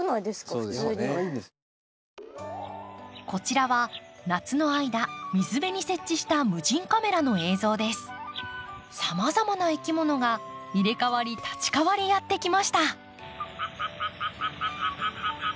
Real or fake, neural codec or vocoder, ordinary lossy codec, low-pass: real; none; none; none